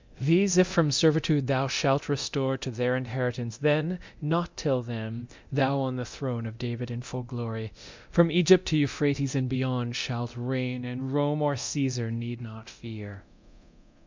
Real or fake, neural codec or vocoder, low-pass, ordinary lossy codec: fake; codec, 24 kHz, 0.9 kbps, DualCodec; 7.2 kHz; MP3, 64 kbps